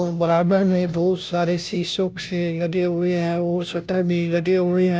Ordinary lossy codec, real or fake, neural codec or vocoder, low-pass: none; fake; codec, 16 kHz, 0.5 kbps, FunCodec, trained on Chinese and English, 25 frames a second; none